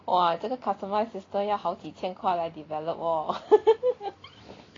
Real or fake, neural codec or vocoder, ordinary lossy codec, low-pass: real; none; AAC, 32 kbps; 7.2 kHz